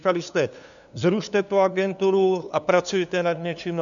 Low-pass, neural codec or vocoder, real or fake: 7.2 kHz; codec, 16 kHz, 2 kbps, FunCodec, trained on LibriTTS, 25 frames a second; fake